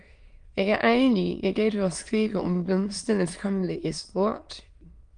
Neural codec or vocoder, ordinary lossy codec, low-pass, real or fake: autoencoder, 22.05 kHz, a latent of 192 numbers a frame, VITS, trained on many speakers; Opus, 32 kbps; 9.9 kHz; fake